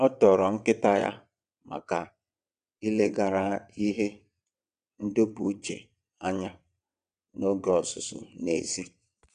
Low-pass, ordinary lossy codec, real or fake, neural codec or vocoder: 9.9 kHz; none; fake; vocoder, 22.05 kHz, 80 mel bands, WaveNeXt